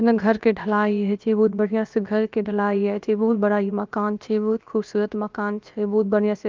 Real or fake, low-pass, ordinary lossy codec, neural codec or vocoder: fake; 7.2 kHz; Opus, 32 kbps; codec, 16 kHz, about 1 kbps, DyCAST, with the encoder's durations